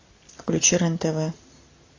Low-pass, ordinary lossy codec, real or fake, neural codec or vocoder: 7.2 kHz; AAC, 32 kbps; real; none